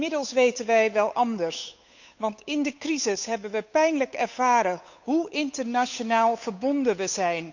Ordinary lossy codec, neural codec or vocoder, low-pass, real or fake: none; codec, 16 kHz, 8 kbps, FunCodec, trained on Chinese and English, 25 frames a second; 7.2 kHz; fake